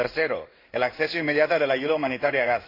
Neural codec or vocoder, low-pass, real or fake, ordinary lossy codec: codec, 16 kHz in and 24 kHz out, 1 kbps, XY-Tokenizer; 5.4 kHz; fake; AAC, 32 kbps